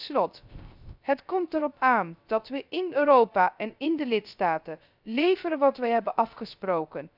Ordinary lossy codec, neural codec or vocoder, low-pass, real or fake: none; codec, 16 kHz, 0.3 kbps, FocalCodec; 5.4 kHz; fake